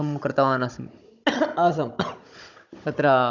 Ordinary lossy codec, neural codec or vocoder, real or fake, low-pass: none; codec, 16 kHz, 16 kbps, FunCodec, trained on Chinese and English, 50 frames a second; fake; 7.2 kHz